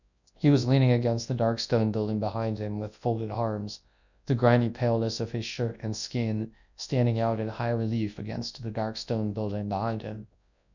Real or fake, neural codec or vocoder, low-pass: fake; codec, 24 kHz, 0.9 kbps, WavTokenizer, large speech release; 7.2 kHz